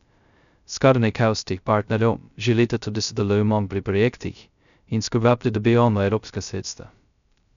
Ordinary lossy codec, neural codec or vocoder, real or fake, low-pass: none; codec, 16 kHz, 0.2 kbps, FocalCodec; fake; 7.2 kHz